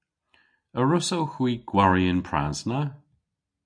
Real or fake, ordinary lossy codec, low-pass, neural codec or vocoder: real; AAC, 64 kbps; 9.9 kHz; none